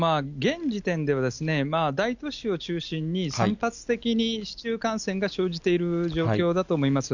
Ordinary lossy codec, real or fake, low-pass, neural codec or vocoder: none; real; 7.2 kHz; none